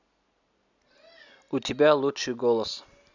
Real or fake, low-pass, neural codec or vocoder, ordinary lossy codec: real; 7.2 kHz; none; none